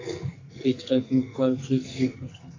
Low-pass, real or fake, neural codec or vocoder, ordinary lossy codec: 7.2 kHz; fake; codec, 44.1 kHz, 2.6 kbps, SNAC; AAC, 48 kbps